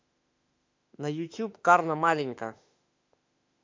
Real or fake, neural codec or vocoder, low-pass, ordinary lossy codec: fake; autoencoder, 48 kHz, 32 numbers a frame, DAC-VAE, trained on Japanese speech; 7.2 kHz; MP3, 64 kbps